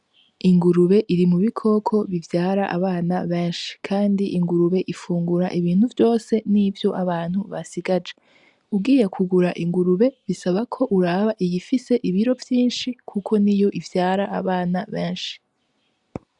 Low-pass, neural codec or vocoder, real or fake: 10.8 kHz; none; real